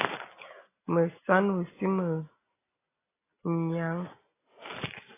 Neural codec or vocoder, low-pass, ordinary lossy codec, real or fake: none; 3.6 kHz; AAC, 16 kbps; real